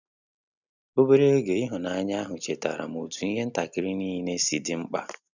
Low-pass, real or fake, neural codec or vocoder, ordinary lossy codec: 7.2 kHz; real; none; none